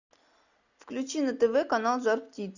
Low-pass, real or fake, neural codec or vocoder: 7.2 kHz; real; none